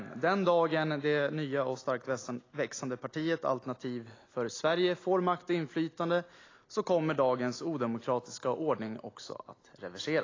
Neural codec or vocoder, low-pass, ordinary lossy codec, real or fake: none; 7.2 kHz; AAC, 32 kbps; real